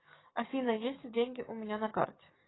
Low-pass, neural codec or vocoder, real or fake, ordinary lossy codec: 7.2 kHz; codec, 44.1 kHz, 7.8 kbps, DAC; fake; AAC, 16 kbps